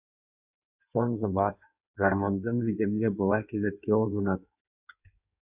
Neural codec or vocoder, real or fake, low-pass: codec, 16 kHz in and 24 kHz out, 1.1 kbps, FireRedTTS-2 codec; fake; 3.6 kHz